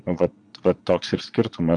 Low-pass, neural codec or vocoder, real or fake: 9.9 kHz; vocoder, 22.05 kHz, 80 mel bands, Vocos; fake